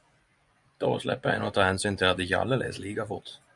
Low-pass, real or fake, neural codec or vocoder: 10.8 kHz; fake; vocoder, 24 kHz, 100 mel bands, Vocos